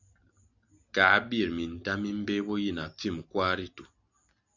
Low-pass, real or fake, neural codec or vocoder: 7.2 kHz; real; none